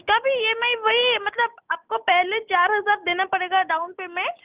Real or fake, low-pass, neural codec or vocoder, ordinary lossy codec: real; 3.6 kHz; none; Opus, 24 kbps